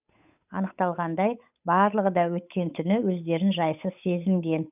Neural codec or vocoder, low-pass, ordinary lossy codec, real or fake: codec, 16 kHz, 8 kbps, FunCodec, trained on Chinese and English, 25 frames a second; 3.6 kHz; none; fake